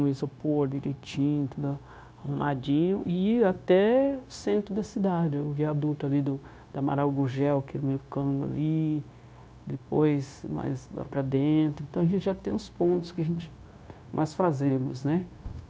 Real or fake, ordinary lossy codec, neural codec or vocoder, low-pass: fake; none; codec, 16 kHz, 0.9 kbps, LongCat-Audio-Codec; none